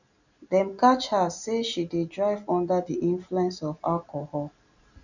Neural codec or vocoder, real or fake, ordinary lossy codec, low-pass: none; real; none; 7.2 kHz